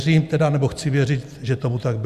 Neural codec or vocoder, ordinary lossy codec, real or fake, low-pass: none; Opus, 64 kbps; real; 14.4 kHz